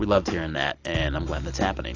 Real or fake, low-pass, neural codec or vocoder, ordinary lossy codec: real; 7.2 kHz; none; AAC, 32 kbps